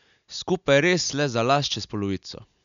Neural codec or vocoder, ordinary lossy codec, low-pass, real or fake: none; MP3, 96 kbps; 7.2 kHz; real